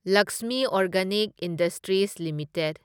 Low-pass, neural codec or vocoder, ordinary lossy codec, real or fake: 19.8 kHz; none; none; real